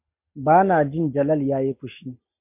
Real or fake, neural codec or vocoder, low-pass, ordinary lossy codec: real; none; 3.6 kHz; MP3, 32 kbps